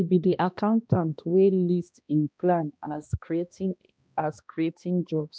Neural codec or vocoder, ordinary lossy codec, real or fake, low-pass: codec, 16 kHz, 1 kbps, X-Codec, HuBERT features, trained on balanced general audio; none; fake; none